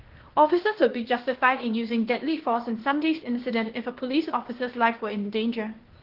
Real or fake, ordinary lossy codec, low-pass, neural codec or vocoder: fake; Opus, 16 kbps; 5.4 kHz; codec, 16 kHz, 0.8 kbps, ZipCodec